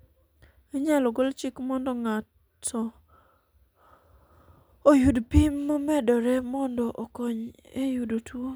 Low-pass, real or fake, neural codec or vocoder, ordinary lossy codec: none; real; none; none